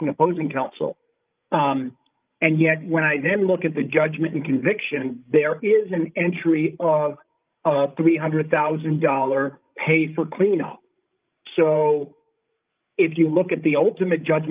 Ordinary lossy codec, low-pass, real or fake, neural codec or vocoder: Opus, 32 kbps; 3.6 kHz; fake; codec, 16 kHz, 16 kbps, FreqCodec, larger model